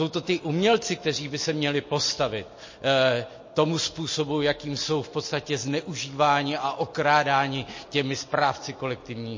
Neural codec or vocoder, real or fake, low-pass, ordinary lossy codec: none; real; 7.2 kHz; MP3, 32 kbps